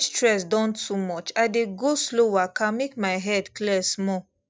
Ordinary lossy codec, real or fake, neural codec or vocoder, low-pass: none; real; none; none